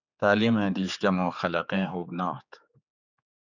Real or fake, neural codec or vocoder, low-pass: fake; codec, 16 kHz, 4 kbps, X-Codec, HuBERT features, trained on general audio; 7.2 kHz